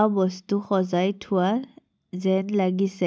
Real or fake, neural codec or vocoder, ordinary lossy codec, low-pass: real; none; none; none